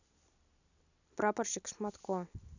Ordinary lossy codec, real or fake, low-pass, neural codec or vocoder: none; real; 7.2 kHz; none